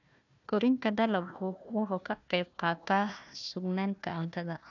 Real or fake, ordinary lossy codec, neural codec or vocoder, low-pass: fake; none; codec, 16 kHz, 1 kbps, FunCodec, trained on Chinese and English, 50 frames a second; 7.2 kHz